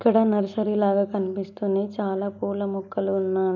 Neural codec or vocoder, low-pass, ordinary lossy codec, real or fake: none; 7.2 kHz; none; real